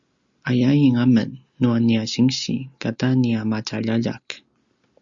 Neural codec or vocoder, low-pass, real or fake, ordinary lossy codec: none; 7.2 kHz; real; Opus, 64 kbps